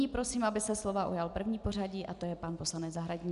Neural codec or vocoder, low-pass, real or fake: none; 10.8 kHz; real